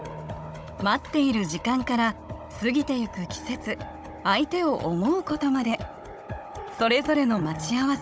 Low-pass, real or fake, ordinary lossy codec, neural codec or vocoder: none; fake; none; codec, 16 kHz, 16 kbps, FunCodec, trained on Chinese and English, 50 frames a second